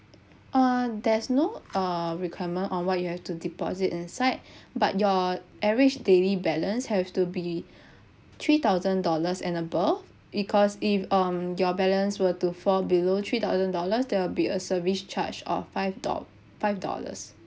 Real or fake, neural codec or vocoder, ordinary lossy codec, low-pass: real; none; none; none